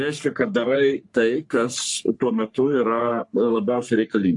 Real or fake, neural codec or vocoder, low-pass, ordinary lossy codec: fake; codec, 44.1 kHz, 3.4 kbps, Pupu-Codec; 10.8 kHz; AAC, 64 kbps